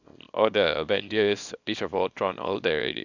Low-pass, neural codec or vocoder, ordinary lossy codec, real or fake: 7.2 kHz; codec, 24 kHz, 0.9 kbps, WavTokenizer, small release; none; fake